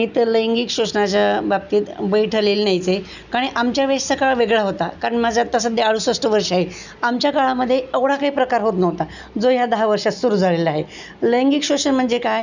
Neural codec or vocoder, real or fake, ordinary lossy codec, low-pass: none; real; none; 7.2 kHz